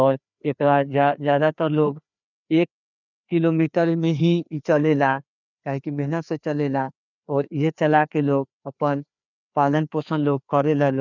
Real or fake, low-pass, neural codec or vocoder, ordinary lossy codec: fake; 7.2 kHz; codec, 16 kHz, 2 kbps, FreqCodec, larger model; none